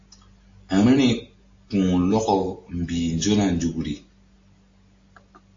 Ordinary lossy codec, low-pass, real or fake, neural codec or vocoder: AAC, 48 kbps; 7.2 kHz; real; none